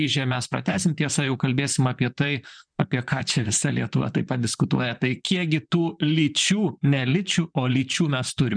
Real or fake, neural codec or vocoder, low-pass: fake; vocoder, 44.1 kHz, 128 mel bands, Pupu-Vocoder; 9.9 kHz